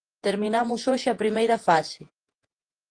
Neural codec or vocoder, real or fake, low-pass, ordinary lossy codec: vocoder, 48 kHz, 128 mel bands, Vocos; fake; 9.9 kHz; Opus, 24 kbps